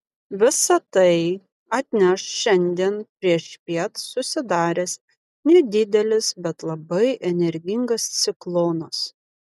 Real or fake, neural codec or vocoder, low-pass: real; none; 14.4 kHz